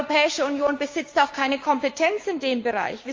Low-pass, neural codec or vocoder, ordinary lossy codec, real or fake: 7.2 kHz; codec, 16 kHz, 6 kbps, DAC; Opus, 32 kbps; fake